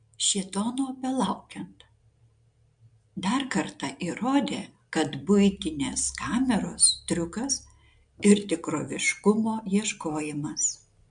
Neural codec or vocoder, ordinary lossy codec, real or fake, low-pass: none; MP3, 64 kbps; real; 9.9 kHz